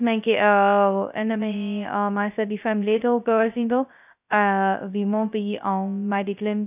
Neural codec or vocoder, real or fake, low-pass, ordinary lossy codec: codec, 16 kHz, 0.2 kbps, FocalCodec; fake; 3.6 kHz; none